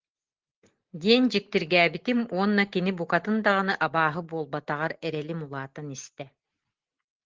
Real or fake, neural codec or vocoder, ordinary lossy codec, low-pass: real; none; Opus, 16 kbps; 7.2 kHz